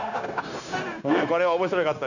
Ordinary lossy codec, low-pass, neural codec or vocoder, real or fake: none; 7.2 kHz; codec, 16 kHz, 0.9 kbps, LongCat-Audio-Codec; fake